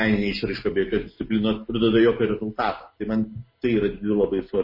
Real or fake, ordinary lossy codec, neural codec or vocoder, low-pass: real; MP3, 24 kbps; none; 5.4 kHz